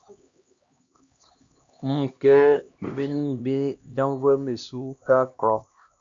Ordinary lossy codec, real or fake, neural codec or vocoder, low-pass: Opus, 64 kbps; fake; codec, 16 kHz, 1 kbps, X-Codec, HuBERT features, trained on LibriSpeech; 7.2 kHz